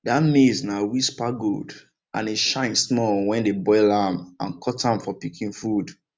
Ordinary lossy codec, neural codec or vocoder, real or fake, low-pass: none; none; real; none